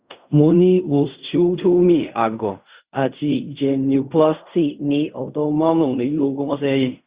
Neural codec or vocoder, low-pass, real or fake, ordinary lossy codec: codec, 16 kHz in and 24 kHz out, 0.4 kbps, LongCat-Audio-Codec, fine tuned four codebook decoder; 3.6 kHz; fake; Opus, 64 kbps